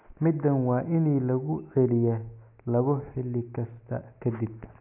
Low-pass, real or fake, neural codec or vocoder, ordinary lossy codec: 3.6 kHz; real; none; none